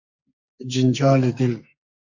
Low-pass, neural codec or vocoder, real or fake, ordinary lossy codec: 7.2 kHz; codec, 44.1 kHz, 2.6 kbps, SNAC; fake; AAC, 48 kbps